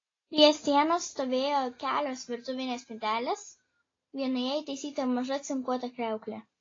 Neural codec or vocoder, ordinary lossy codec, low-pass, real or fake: none; AAC, 32 kbps; 7.2 kHz; real